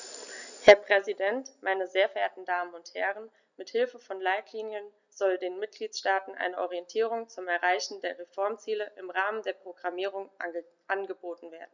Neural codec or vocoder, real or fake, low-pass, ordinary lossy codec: none; real; 7.2 kHz; none